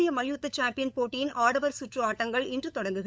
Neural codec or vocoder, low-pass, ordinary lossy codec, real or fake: codec, 16 kHz, 8 kbps, FunCodec, trained on LibriTTS, 25 frames a second; none; none; fake